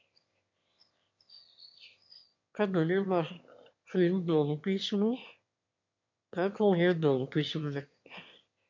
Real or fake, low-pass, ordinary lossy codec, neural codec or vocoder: fake; 7.2 kHz; MP3, 48 kbps; autoencoder, 22.05 kHz, a latent of 192 numbers a frame, VITS, trained on one speaker